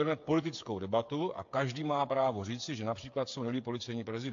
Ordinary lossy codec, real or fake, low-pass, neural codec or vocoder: AAC, 48 kbps; fake; 7.2 kHz; codec, 16 kHz, 8 kbps, FreqCodec, smaller model